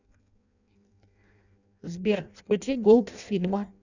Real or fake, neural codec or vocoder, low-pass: fake; codec, 16 kHz in and 24 kHz out, 0.6 kbps, FireRedTTS-2 codec; 7.2 kHz